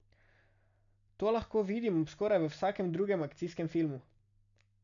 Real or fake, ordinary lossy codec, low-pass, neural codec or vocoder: real; none; 7.2 kHz; none